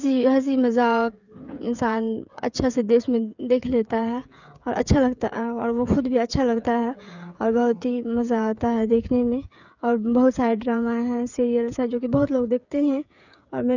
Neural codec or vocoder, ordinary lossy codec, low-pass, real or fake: codec, 16 kHz, 8 kbps, FreqCodec, smaller model; none; 7.2 kHz; fake